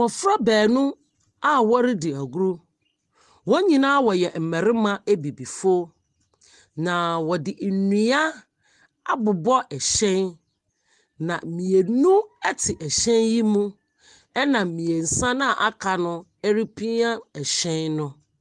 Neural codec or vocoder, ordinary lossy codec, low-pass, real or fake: none; Opus, 24 kbps; 10.8 kHz; real